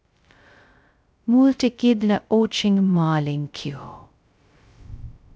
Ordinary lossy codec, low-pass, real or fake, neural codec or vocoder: none; none; fake; codec, 16 kHz, 0.2 kbps, FocalCodec